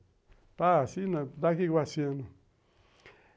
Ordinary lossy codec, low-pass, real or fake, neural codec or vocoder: none; none; real; none